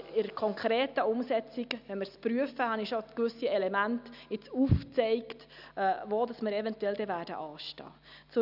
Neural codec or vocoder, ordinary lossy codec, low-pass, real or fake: none; none; 5.4 kHz; real